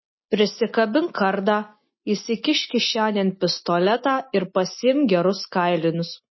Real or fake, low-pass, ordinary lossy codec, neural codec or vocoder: real; 7.2 kHz; MP3, 24 kbps; none